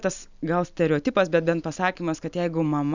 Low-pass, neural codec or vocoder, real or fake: 7.2 kHz; none; real